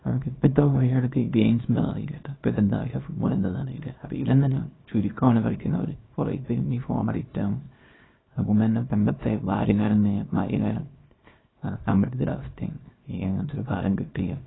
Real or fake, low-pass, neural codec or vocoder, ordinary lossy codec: fake; 7.2 kHz; codec, 24 kHz, 0.9 kbps, WavTokenizer, small release; AAC, 16 kbps